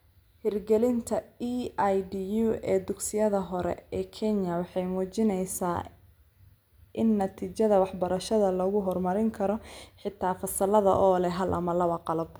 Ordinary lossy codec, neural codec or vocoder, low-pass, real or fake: none; none; none; real